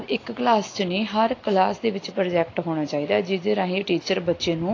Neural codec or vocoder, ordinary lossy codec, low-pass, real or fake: none; AAC, 32 kbps; 7.2 kHz; real